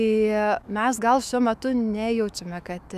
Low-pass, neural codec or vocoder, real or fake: 14.4 kHz; autoencoder, 48 kHz, 128 numbers a frame, DAC-VAE, trained on Japanese speech; fake